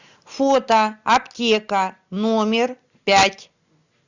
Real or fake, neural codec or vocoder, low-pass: real; none; 7.2 kHz